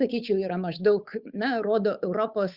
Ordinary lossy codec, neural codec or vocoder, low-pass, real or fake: Opus, 64 kbps; codec, 16 kHz, 8 kbps, FunCodec, trained on Chinese and English, 25 frames a second; 5.4 kHz; fake